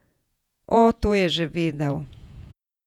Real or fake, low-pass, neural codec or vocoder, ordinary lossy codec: fake; 19.8 kHz; vocoder, 48 kHz, 128 mel bands, Vocos; none